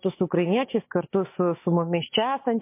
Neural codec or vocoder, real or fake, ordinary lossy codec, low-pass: none; real; MP3, 24 kbps; 3.6 kHz